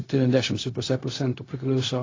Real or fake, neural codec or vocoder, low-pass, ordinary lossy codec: fake; codec, 16 kHz, 0.4 kbps, LongCat-Audio-Codec; 7.2 kHz; AAC, 32 kbps